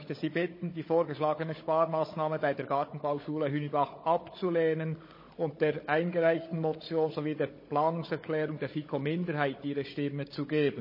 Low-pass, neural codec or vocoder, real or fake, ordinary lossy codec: 5.4 kHz; codec, 16 kHz, 16 kbps, FunCodec, trained on Chinese and English, 50 frames a second; fake; MP3, 24 kbps